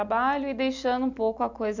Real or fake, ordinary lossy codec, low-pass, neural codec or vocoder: real; none; 7.2 kHz; none